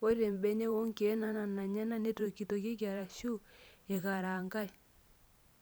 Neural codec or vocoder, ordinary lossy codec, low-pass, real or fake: vocoder, 44.1 kHz, 128 mel bands every 512 samples, BigVGAN v2; none; none; fake